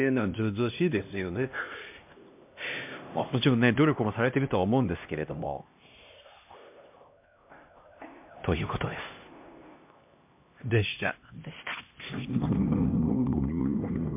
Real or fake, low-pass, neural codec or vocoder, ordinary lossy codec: fake; 3.6 kHz; codec, 16 kHz, 1 kbps, X-Codec, HuBERT features, trained on LibriSpeech; MP3, 32 kbps